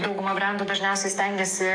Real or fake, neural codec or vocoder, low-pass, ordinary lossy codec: fake; codec, 44.1 kHz, 7.8 kbps, DAC; 9.9 kHz; AAC, 64 kbps